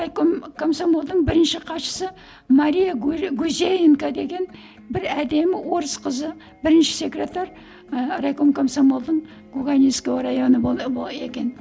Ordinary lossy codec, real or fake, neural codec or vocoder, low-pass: none; real; none; none